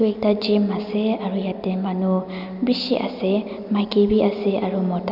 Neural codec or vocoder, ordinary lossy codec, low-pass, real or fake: none; none; 5.4 kHz; real